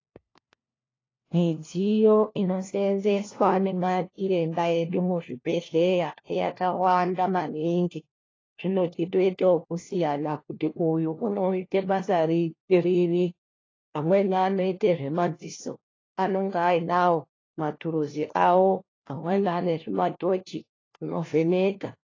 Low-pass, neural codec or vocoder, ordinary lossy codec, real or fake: 7.2 kHz; codec, 16 kHz, 1 kbps, FunCodec, trained on LibriTTS, 50 frames a second; AAC, 32 kbps; fake